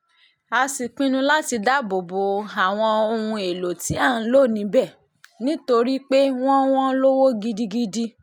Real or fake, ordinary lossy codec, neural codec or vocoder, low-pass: real; none; none; 19.8 kHz